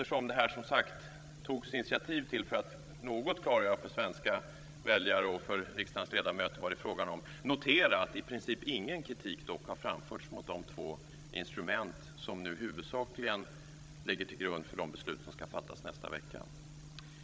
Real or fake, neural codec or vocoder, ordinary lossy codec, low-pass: fake; codec, 16 kHz, 16 kbps, FreqCodec, larger model; none; none